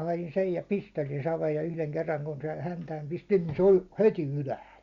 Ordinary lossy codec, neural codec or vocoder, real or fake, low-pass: Opus, 64 kbps; none; real; 7.2 kHz